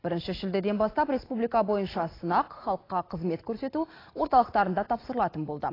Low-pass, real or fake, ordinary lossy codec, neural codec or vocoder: 5.4 kHz; real; AAC, 24 kbps; none